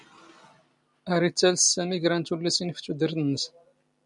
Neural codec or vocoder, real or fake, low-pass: none; real; 10.8 kHz